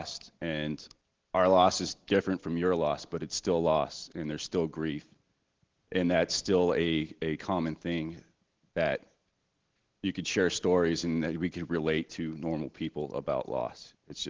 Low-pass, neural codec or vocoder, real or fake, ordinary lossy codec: 7.2 kHz; none; real; Opus, 16 kbps